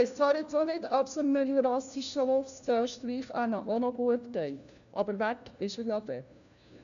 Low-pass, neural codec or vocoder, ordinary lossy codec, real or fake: 7.2 kHz; codec, 16 kHz, 1 kbps, FunCodec, trained on LibriTTS, 50 frames a second; none; fake